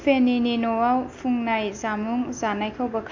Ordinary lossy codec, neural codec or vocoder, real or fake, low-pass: MP3, 64 kbps; none; real; 7.2 kHz